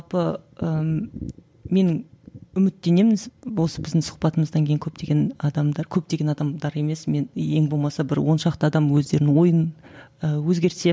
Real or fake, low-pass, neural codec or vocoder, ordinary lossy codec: real; none; none; none